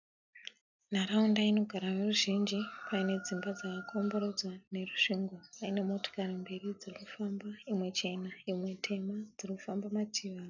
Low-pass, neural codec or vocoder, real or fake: 7.2 kHz; none; real